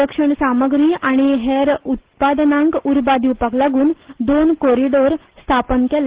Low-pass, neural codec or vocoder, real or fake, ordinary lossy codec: 3.6 kHz; none; real; Opus, 16 kbps